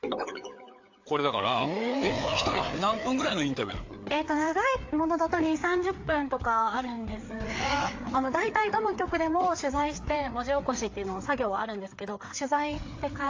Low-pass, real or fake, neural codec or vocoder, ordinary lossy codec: 7.2 kHz; fake; codec, 16 kHz, 4 kbps, FreqCodec, larger model; AAC, 48 kbps